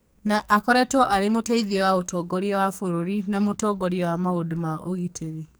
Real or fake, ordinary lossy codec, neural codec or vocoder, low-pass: fake; none; codec, 44.1 kHz, 2.6 kbps, SNAC; none